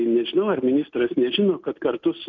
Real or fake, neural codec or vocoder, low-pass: real; none; 7.2 kHz